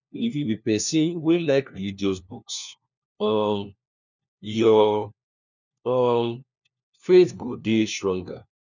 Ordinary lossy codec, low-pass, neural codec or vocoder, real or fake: none; 7.2 kHz; codec, 16 kHz, 1 kbps, FunCodec, trained on LibriTTS, 50 frames a second; fake